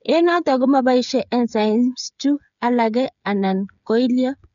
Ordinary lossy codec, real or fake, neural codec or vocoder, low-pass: none; fake; codec, 16 kHz, 8 kbps, FreqCodec, smaller model; 7.2 kHz